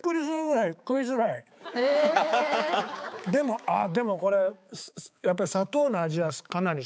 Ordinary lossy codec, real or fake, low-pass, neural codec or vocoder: none; fake; none; codec, 16 kHz, 4 kbps, X-Codec, HuBERT features, trained on balanced general audio